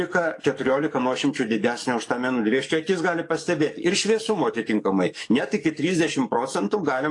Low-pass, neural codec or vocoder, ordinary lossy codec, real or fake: 10.8 kHz; codec, 44.1 kHz, 7.8 kbps, DAC; AAC, 48 kbps; fake